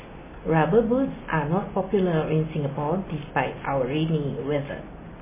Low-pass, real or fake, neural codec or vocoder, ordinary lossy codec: 3.6 kHz; real; none; MP3, 16 kbps